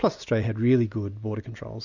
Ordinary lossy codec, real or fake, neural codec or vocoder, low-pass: Opus, 64 kbps; real; none; 7.2 kHz